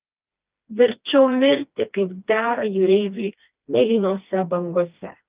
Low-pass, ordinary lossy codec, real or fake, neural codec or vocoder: 3.6 kHz; Opus, 24 kbps; fake; codec, 16 kHz, 2 kbps, FreqCodec, smaller model